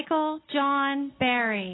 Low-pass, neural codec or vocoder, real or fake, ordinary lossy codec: 7.2 kHz; none; real; AAC, 16 kbps